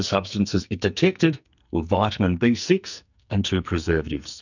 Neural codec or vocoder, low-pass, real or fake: codec, 44.1 kHz, 2.6 kbps, SNAC; 7.2 kHz; fake